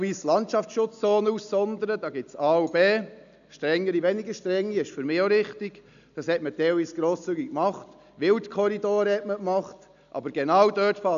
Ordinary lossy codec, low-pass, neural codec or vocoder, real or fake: none; 7.2 kHz; none; real